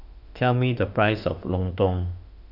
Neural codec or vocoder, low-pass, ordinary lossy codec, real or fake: autoencoder, 48 kHz, 32 numbers a frame, DAC-VAE, trained on Japanese speech; 5.4 kHz; none; fake